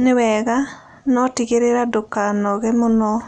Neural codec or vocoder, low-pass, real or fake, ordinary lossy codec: none; 9.9 kHz; real; none